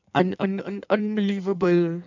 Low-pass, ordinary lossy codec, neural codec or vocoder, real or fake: 7.2 kHz; none; codec, 16 kHz in and 24 kHz out, 1.1 kbps, FireRedTTS-2 codec; fake